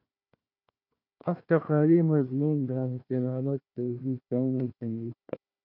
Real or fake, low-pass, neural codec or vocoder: fake; 5.4 kHz; codec, 16 kHz, 1 kbps, FunCodec, trained on Chinese and English, 50 frames a second